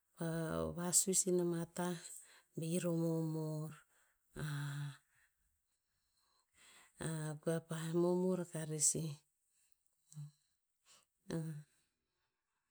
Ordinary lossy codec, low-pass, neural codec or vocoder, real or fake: none; none; none; real